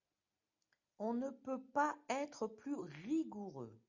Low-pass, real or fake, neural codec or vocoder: 7.2 kHz; real; none